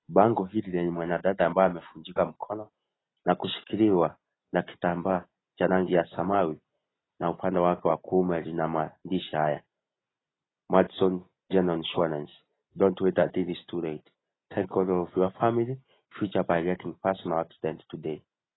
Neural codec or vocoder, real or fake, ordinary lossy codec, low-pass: none; real; AAC, 16 kbps; 7.2 kHz